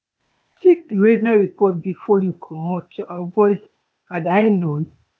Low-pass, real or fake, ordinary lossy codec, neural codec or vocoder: none; fake; none; codec, 16 kHz, 0.8 kbps, ZipCodec